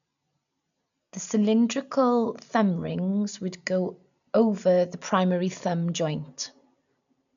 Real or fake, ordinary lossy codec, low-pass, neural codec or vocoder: real; none; 7.2 kHz; none